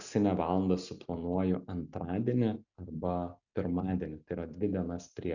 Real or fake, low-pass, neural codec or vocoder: real; 7.2 kHz; none